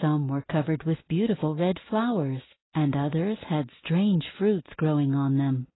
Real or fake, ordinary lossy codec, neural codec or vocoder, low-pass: real; AAC, 16 kbps; none; 7.2 kHz